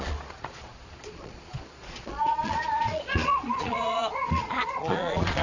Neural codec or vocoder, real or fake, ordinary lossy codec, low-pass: vocoder, 22.05 kHz, 80 mel bands, Vocos; fake; none; 7.2 kHz